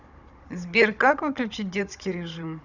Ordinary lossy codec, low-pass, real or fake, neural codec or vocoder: none; 7.2 kHz; fake; codec, 16 kHz, 16 kbps, FunCodec, trained on Chinese and English, 50 frames a second